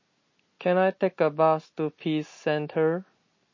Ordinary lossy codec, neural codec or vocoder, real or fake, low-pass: MP3, 32 kbps; none; real; 7.2 kHz